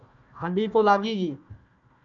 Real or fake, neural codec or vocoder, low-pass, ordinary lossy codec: fake; codec, 16 kHz, 1 kbps, FunCodec, trained on Chinese and English, 50 frames a second; 7.2 kHz; MP3, 96 kbps